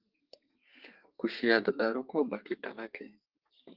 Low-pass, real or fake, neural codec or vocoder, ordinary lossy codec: 5.4 kHz; fake; codec, 32 kHz, 1.9 kbps, SNAC; Opus, 32 kbps